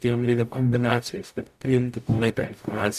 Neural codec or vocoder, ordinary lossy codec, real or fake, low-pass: codec, 44.1 kHz, 0.9 kbps, DAC; AAC, 96 kbps; fake; 14.4 kHz